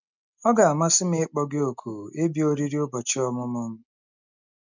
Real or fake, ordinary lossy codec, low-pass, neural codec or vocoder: real; none; 7.2 kHz; none